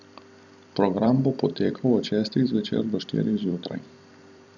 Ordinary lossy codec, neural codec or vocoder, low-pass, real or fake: none; vocoder, 44.1 kHz, 128 mel bands every 256 samples, BigVGAN v2; 7.2 kHz; fake